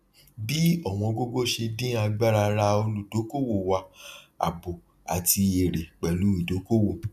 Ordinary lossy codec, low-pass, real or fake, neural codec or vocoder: none; 14.4 kHz; real; none